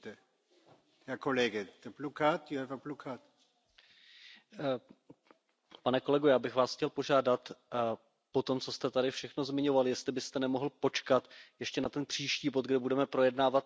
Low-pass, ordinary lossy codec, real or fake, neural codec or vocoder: none; none; real; none